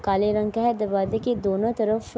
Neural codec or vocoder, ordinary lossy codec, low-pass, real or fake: none; none; none; real